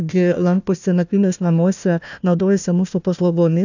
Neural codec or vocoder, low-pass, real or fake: codec, 16 kHz, 1 kbps, FunCodec, trained on Chinese and English, 50 frames a second; 7.2 kHz; fake